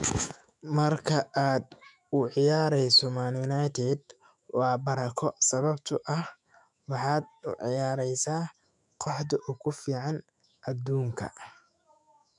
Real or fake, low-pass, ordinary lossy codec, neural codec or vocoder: fake; 10.8 kHz; none; autoencoder, 48 kHz, 128 numbers a frame, DAC-VAE, trained on Japanese speech